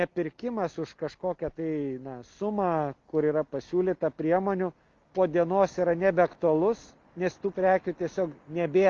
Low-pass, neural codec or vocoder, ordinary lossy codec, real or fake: 7.2 kHz; none; Opus, 32 kbps; real